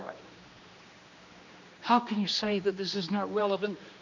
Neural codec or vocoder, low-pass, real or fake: codec, 16 kHz, 2 kbps, X-Codec, HuBERT features, trained on general audio; 7.2 kHz; fake